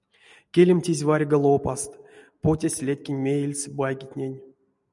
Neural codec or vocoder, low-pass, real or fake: none; 10.8 kHz; real